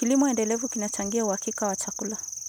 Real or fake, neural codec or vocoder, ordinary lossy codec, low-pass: real; none; none; none